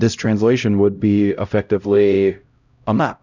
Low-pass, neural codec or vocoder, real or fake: 7.2 kHz; codec, 16 kHz, 0.5 kbps, X-Codec, HuBERT features, trained on LibriSpeech; fake